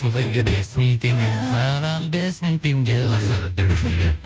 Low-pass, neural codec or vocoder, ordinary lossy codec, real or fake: none; codec, 16 kHz, 0.5 kbps, FunCodec, trained on Chinese and English, 25 frames a second; none; fake